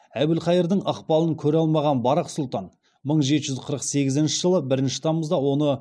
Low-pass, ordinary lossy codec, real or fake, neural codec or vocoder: none; none; real; none